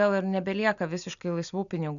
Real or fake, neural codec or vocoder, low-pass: real; none; 7.2 kHz